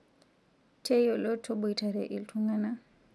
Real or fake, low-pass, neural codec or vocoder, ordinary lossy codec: real; none; none; none